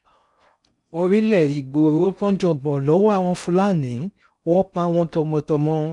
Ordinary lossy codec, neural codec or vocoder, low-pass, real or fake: none; codec, 16 kHz in and 24 kHz out, 0.6 kbps, FocalCodec, streaming, 4096 codes; 10.8 kHz; fake